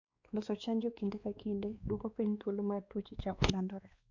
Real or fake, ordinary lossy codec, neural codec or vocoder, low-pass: fake; Opus, 64 kbps; codec, 16 kHz, 2 kbps, X-Codec, WavLM features, trained on Multilingual LibriSpeech; 7.2 kHz